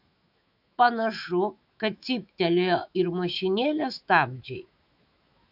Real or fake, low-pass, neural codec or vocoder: fake; 5.4 kHz; autoencoder, 48 kHz, 128 numbers a frame, DAC-VAE, trained on Japanese speech